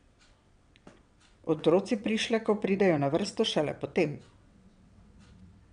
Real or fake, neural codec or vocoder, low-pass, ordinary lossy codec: fake; vocoder, 22.05 kHz, 80 mel bands, WaveNeXt; 9.9 kHz; none